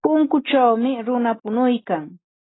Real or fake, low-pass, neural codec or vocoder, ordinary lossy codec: real; 7.2 kHz; none; AAC, 16 kbps